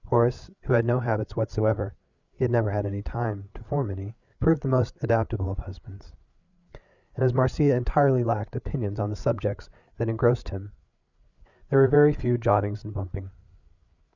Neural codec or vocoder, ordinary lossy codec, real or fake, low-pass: codec, 16 kHz, 8 kbps, FreqCodec, larger model; Opus, 64 kbps; fake; 7.2 kHz